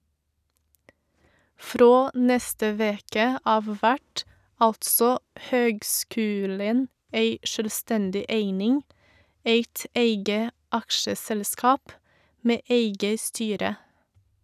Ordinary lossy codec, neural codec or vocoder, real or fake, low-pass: none; none; real; 14.4 kHz